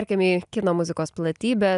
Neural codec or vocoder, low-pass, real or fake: none; 10.8 kHz; real